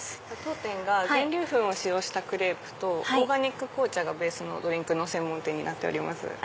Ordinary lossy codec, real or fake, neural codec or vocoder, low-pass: none; real; none; none